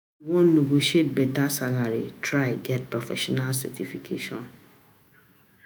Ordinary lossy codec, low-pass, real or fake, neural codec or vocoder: none; none; fake; autoencoder, 48 kHz, 128 numbers a frame, DAC-VAE, trained on Japanese speech